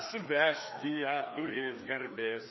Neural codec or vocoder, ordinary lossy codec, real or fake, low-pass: codec, 16 kHz, 2 kbps, FreqCodec, larger model; MP3, 24 kbps; fake; 7.2 kHz